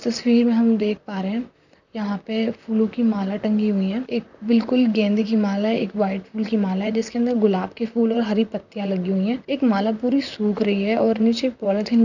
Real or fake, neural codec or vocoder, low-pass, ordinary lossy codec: fake; vocoder, 44.1 kHz, 128 mel bands, Pupu-Vocoder; 7.2 kHz; none